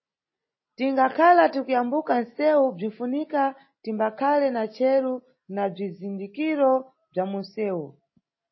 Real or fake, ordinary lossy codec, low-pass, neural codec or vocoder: real; MP3, 24 kbps; 7.2 kHz; none